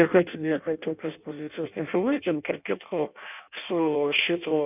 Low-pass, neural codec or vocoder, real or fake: 3.6 kHz; codec, 16 kHz in and 24 kHz out, 0.6 kbps, FireRedTTS-2 codec; fake